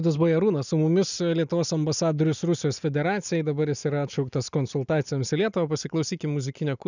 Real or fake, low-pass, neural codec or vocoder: real; 7.2 kHz; none